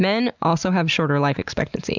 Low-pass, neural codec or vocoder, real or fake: 7.2 kHz; none; real